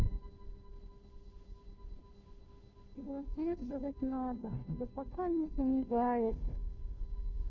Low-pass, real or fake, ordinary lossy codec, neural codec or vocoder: 7.2 kHz; fake; none; codec, 16 kHz, 0.5 kbps, FunCodec, trained on Chinese and English, 25 frames a second